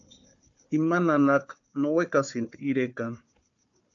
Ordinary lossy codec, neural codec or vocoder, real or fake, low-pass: AAC, 64 kbps; codec, 16 kHz, 4 kbps, FunCodec, trained on Chinese and English, 50 frames a second; fake; 7.2 kHz